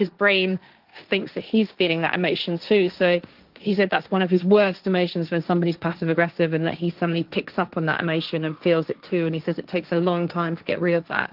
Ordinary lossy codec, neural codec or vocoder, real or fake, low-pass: Opus, 32 kbps; codec, 16 kHz, 1.1 kbps, Voila-Tokenizer; fake; 5.4 kHz